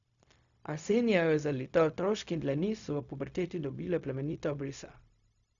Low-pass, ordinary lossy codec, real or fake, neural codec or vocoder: 7.2 kHz; none; fake; codec, 16 kHz, 0.4 kbps, LongCat-Audio-Codec